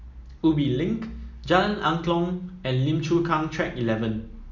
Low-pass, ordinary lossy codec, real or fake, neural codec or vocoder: 7.2 kHz; none; real; none